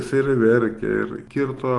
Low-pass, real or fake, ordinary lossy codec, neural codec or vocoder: 10.8 kHz; real; Opus, 24 kbps; none